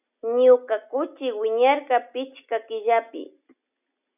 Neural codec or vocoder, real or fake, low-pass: none; real; 3.6 kHz